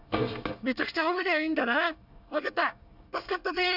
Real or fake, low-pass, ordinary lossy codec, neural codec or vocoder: fake; 5.4 kHz; none; codec, 24 kHz, 1 kbps, SNAC